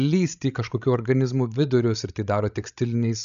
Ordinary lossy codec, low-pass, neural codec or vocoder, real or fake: MP3, 96 kbps; 7.2 kHz; codec, 16 kHz, 16 kbps, FreqCodec, larger model; fake